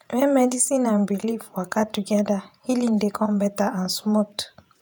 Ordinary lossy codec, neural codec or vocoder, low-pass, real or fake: none; vocoder, 48 kHz, 128 mel bands, Vocos; none; fake